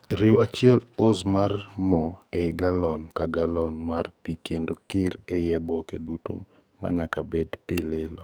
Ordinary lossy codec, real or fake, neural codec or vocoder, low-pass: none; fake; codec, 44.1 kHz, 2.6 kbps, SNAC; none